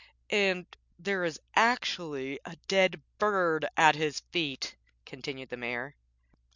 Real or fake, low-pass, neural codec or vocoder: real; 7.2 kHz; none